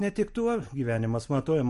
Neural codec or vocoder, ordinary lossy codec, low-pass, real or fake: none; MP3, 48 kbps; 14.4 kHz; real